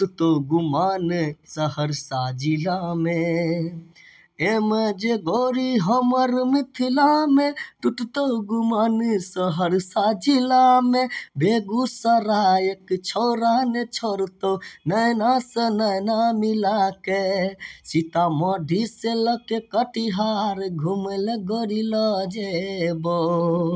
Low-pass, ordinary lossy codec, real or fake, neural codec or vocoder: none; none; real; none